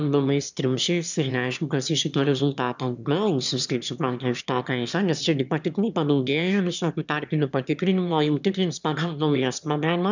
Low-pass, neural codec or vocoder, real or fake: 7.2 kHz; autoencoder, 22.05 kHz, a latent of 192 numbers a frame, VITS, trained on one speaker; fake